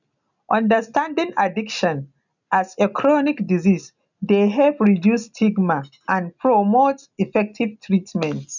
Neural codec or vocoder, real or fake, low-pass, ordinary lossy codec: none; real; 7.2 kHz; none